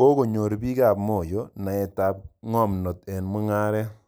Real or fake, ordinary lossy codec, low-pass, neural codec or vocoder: real; none; none; none